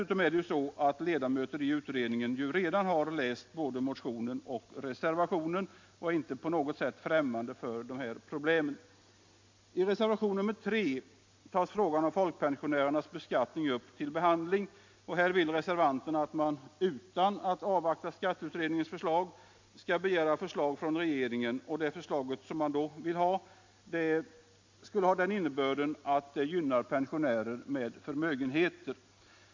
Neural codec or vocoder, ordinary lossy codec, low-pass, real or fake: none; MP3, 48 kbps; 7.2 kHz; real